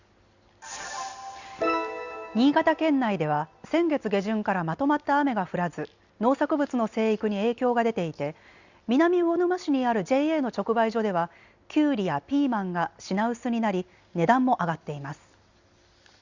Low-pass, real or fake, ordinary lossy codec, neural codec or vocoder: 7.2 kHz; real; Opus, 64 kbps; none